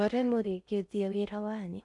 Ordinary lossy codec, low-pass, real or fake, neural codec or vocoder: none; 10.8 kHz; fake; codec, 16 kHz in and 24 kHz out, 0.6 kbps, FocalCodec, streaming, 4096 codes